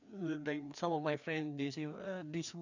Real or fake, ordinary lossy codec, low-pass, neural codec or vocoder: fake; none; 7.2 kHz; codec, 16 kHz, 2 kbps, FreqCodec, larger model